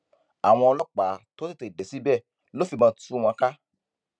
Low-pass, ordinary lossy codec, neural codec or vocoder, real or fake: 9.9 kHz; none; none; real